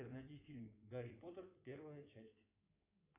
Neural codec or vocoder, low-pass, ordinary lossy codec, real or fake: codec, 16 kHz in and 24 kHz out, 2.2 kbps, FireRedTTS-2 codec; 3.6 kHz; AAC, 32 kbps; fake